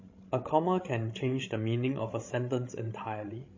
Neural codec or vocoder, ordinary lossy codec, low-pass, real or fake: codec, 16 kHz, 16 kbps, FreqCodec, larger model; MP3, 32 kbps; 7.2 kHz; fake